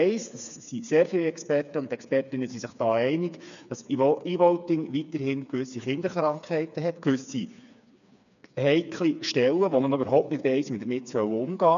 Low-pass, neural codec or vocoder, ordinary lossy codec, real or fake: 7.2 kHz; codec, 16 kHz, 4 kbps, FreqCodec, smaller model; none; fake